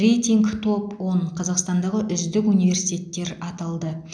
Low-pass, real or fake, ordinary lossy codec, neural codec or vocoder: 9.9 kHz; real; none; none